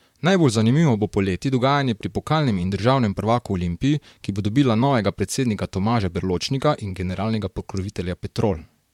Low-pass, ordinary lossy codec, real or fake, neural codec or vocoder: 19.8 kHz; MP3, 96 kbps; fake; vocoder, 44.1 kHz, 128 mel bands, Pupu-Vocoder